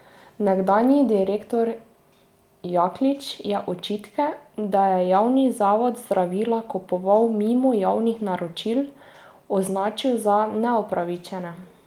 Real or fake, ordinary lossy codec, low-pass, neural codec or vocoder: real; Opus, 32 kbps; 19.8 kHz; none